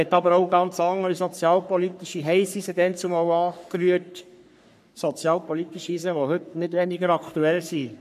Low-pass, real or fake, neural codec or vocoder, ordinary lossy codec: 14.4 kHz; fake; codec, 44.1 kHz, 3.4 kbps, Pupu-Codec; none